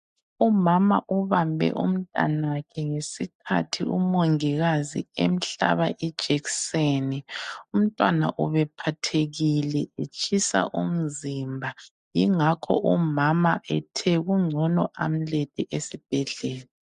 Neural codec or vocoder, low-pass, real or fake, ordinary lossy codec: none; 10.8 kHz; real; MP3, 64 kbps